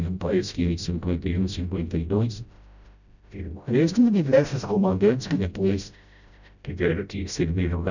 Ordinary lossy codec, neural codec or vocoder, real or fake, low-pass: none; codec, 16 kHz, 0.5 kbps, FreqCodec, smaller model; fake; 7.2 kHz